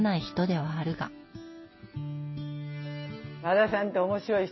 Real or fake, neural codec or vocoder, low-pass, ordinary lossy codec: real; none; 7.2 kHz; MP3, 24 kbps